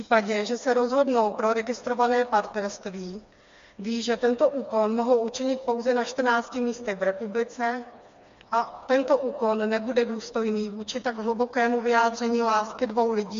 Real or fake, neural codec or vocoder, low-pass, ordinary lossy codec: fake; codec, 16 kHz, 2 kbps, FreqCodec, smaller model; 7.2 kHz; MP3, 48 kbps